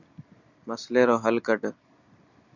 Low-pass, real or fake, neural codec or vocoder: 7.2 kHz; real; none